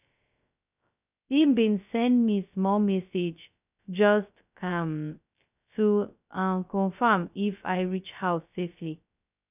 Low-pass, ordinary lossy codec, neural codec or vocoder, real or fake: 3.6 kHz; none; codec, 16 kHz, 0.2 kbps, FocalCodec; fake